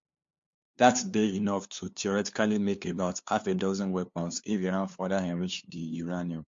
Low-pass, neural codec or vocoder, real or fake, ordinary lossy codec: 7.2 kHz; codec, 16 kHz, 2 kbps, FunCodec, trained on LibriTTS, 25 frames a second; fake; MP3, 48 kbps